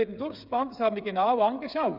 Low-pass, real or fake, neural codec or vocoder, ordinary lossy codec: 5.4 kHz; fake; codec, 16 kHz, 8 kbps, FreqCodec, smaller model; none